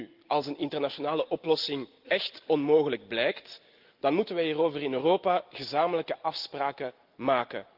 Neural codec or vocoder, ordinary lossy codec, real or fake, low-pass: none; Opus, 32 kbps; real; 5.4 kHz